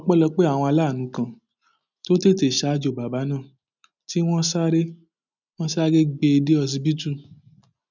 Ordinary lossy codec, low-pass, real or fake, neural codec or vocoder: none; 7.2 kHz; real; none